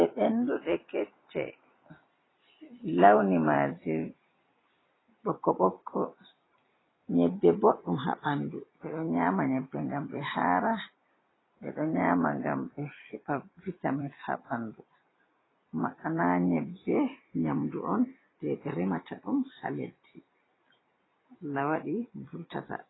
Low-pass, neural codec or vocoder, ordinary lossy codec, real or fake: 7.2 kHz; vocoder, 44.1 kHz, 128 mel bands every 256 samples, BigVGAN v2; AAC, 16 kbps; fake